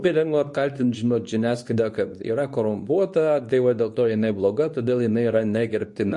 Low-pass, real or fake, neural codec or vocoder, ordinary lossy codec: 10.8 kHz; fake; codec, 24 kHz, 0.9 kbps, WavTokenizer, medium speech release version 2; MP3, 48 kbps